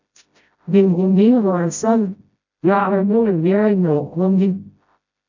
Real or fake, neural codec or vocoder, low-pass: fake; codec, 16 kHz, 0.5 kbps, FreqCodec, smaller model; 7.2 kHz